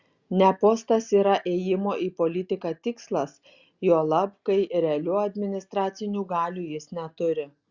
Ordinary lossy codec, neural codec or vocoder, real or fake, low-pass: Opus, 64 kbps; none; real; 7.2 kHz